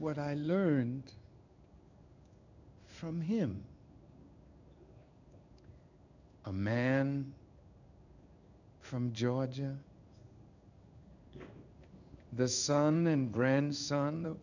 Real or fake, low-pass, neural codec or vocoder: fake; 7.2 kHz; codec, 16 kHz in and 24 kHz out, 1 kbps, XY-Tokenizer